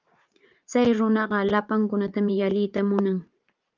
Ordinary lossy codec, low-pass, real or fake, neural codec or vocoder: Opus, 24 kbps; 7.2 kHz; fake; vocoder, 44.1 kHz, 80 mel bands, Vocos